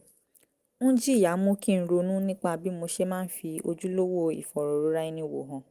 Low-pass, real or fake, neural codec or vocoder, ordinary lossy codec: 14.4 kHz; real; none; Opus, 24 kbps